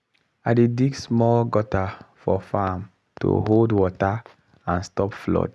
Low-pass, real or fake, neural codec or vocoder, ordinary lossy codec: none; real; none; none